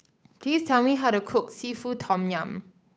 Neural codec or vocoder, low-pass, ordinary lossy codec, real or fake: codec, 16 kHz, 8 kbps, FunCodec, trained on Chinese and English, 25 frames a second; none; none; fake